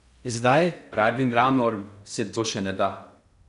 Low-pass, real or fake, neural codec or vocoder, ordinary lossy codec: 10.8 kHz; fake; codec, 16 kHz in and 24 kHz out, 0.6 kbps, FocalCodec, streaming, 4096 codes; none